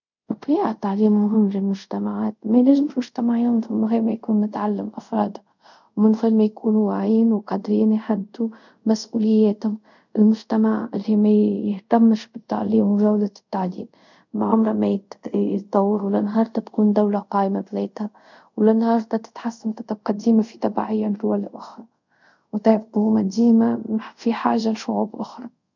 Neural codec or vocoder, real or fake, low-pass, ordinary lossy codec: codec, 24 kHz, 0.5 kbps, DualCodec; fake; 7.2 kHz; none